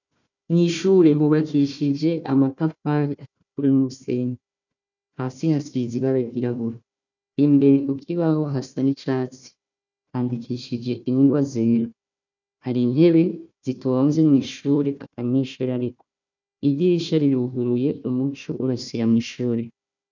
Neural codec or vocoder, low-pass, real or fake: codec, 16 kHz, 1 kbps, FunCodec, trained on Chinese and English, 50 frames a second; 7.2 kHz; fake